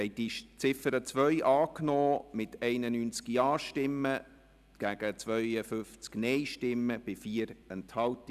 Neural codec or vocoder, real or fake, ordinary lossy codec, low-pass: vocoder, 44.1 kHz, 128 mel bands every 256 samples, BigVGAN v2; fake; none; 14.4 kHz